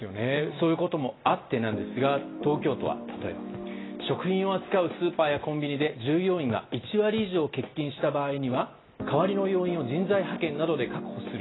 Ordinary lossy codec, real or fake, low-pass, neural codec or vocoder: AAC, 16 kbps; real; 7.2 kHz; none